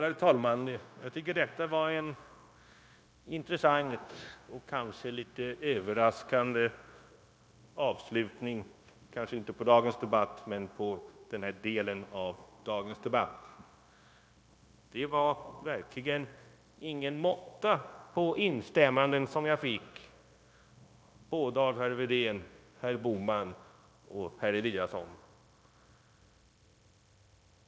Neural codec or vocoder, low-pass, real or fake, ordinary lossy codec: codec, 16 kHz, 0.9 kbps, LongCat-Audio-Codec; none; fake; none